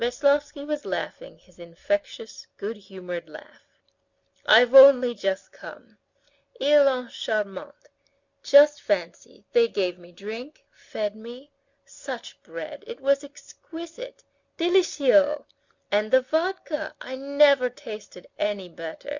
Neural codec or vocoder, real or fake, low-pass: none; real; 7.2 kHz